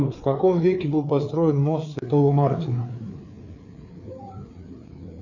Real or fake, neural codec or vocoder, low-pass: fake; codec, 16 kHz, 4 kbps, FreqCodec, larger model; 7.2 kHz